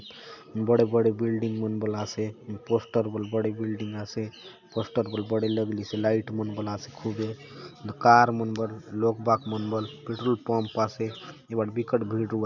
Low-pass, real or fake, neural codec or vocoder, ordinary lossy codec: 7.2 kHz; real; none; none